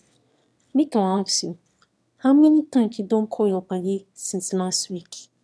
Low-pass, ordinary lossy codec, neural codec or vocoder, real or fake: none; none; autoencoder, 22.05 kHz, a latent of 192 numbers a frame, VITS, trained on one speaker; fake